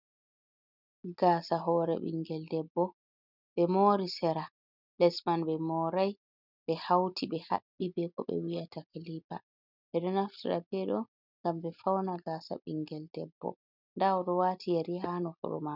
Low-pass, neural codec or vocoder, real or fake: 5.4 kHz; none; real